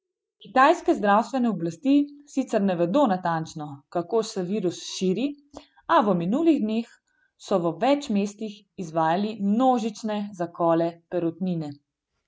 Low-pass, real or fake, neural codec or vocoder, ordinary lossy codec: none; real; none; none